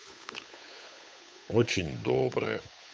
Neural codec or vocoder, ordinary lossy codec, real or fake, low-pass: codec, 16 kHz, 8 kbps, FunCodec, trained on Chinese and English, 25 frames a second; none; fake; none